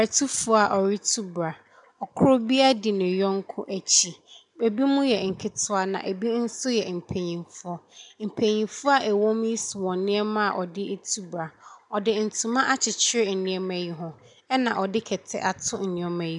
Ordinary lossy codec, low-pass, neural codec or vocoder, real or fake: MP3, 96 kbps; 10.8 kHz; none; real